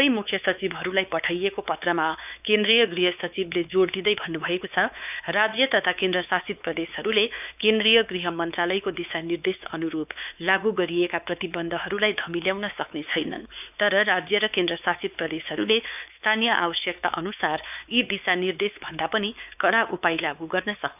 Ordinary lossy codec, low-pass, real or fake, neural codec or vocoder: none; 3.6 kHz; fake; codec, 16 kHz, 4 kbps, X-Codec, WavLM features, trained on Multilingual LibriSpeech